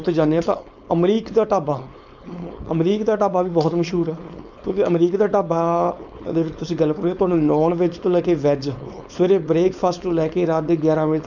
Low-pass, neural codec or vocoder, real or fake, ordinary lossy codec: 7.2 kHz; codec, 16 kHz, 4.8 kbps, FACodec; fake; none